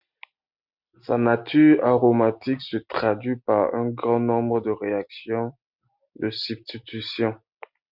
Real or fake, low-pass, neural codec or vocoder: real; 5.4 kHz; none